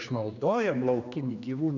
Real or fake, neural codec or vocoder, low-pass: fake; codec, 16 kHz, 2 kbps, X-Codec, HuBERT features, trained on general audio; 7.2 kHz